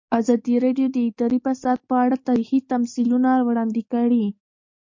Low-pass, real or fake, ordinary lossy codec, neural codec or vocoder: 7.2 kHz; real; MP3, 48 kbps; none